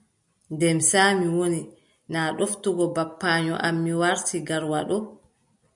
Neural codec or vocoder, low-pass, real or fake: none; 10.8 kHz; real